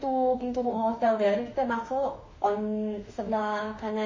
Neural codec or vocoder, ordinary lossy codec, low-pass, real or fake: autoencoder, 48 kHz, 32 numbers a frame, DAC-VAE, trained on Japanese speech; MP3, 32 kbps; 7.2 kHz; fake